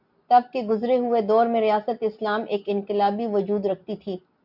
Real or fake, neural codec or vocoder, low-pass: real; none; 5.4 kHz